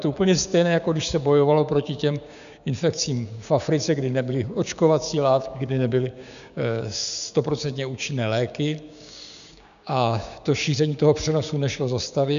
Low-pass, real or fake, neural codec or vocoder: 7.2 kHz; fake; codec, 16 kHz, 6 kbps, DAC